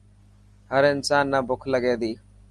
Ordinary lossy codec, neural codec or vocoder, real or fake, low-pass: Opus, 32 kbps; none; real; 10.8 kHz